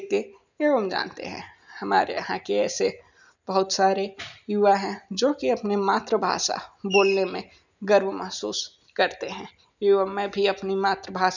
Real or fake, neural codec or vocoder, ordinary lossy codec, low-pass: real; none; none; 7.2 kHz